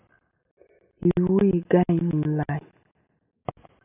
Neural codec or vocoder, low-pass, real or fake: none; 3.6 kHz; real